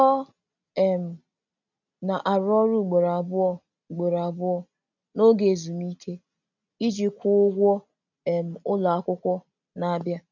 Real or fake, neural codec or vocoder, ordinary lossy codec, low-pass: real; none; none; 7.2 kHz